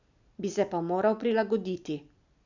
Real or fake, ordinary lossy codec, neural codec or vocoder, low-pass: real; none; none; 7.2 kHz